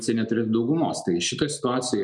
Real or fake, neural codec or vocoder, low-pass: real; none; 10.8 kHz